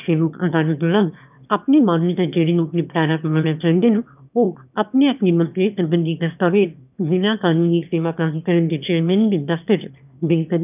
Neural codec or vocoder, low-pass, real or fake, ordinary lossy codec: autoencoder, 22.05 kHz, a latent of 192 numbers a frame, VITS, trained on one speaker; 3.6 kHz; fake; none